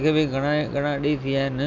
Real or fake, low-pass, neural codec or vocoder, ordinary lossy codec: real; 7.2 kHz; none; none